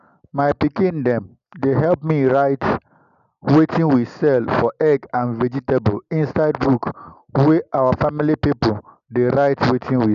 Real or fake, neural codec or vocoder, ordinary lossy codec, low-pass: real; none; none; 7.2 kHz